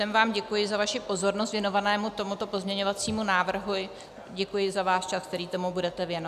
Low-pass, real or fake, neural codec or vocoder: 14.4 kHz; real; none